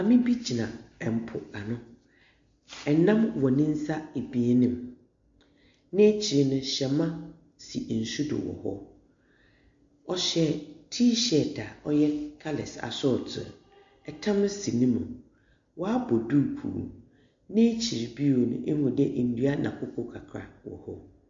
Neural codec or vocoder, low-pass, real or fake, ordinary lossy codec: none; 7.2 kHz; real; MP3, 48 kbps